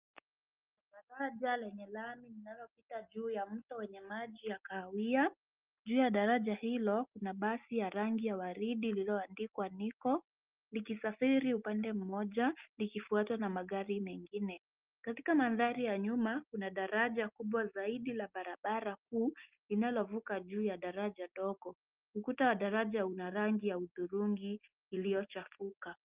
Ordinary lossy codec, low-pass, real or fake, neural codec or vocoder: Opus, 32 kbps; 3.6 kHz; real; none